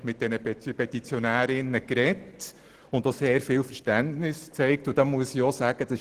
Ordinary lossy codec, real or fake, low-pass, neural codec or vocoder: Opus, 16 kbps; real; 14.4 kHz; none